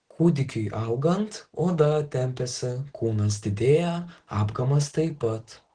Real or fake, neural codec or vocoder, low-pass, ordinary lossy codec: real; none; 9.9 kHz; Opus, 16 kbps